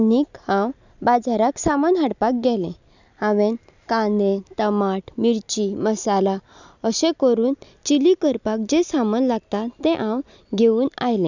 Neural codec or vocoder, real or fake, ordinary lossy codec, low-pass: none; real; none; 7.2 kHz